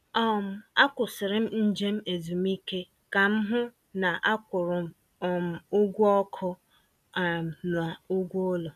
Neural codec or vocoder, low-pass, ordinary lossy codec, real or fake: none; 14.4 kHz; none; real